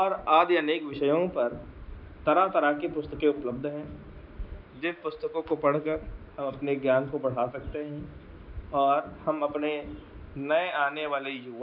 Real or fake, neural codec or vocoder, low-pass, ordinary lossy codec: real; none; 5.4 kHz; none